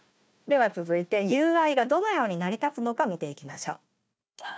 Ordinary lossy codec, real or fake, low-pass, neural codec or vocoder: none; fake; none; codec, 16 kHz, 1 kbps, FunCodec, trained on Chinese and English, 50 frames a second